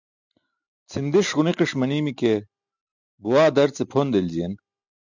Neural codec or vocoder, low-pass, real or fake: none; 7.2 kHz; real